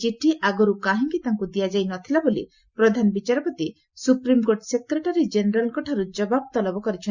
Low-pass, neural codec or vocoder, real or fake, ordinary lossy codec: 7.2 kHz; none; real; Opus, 64 kbps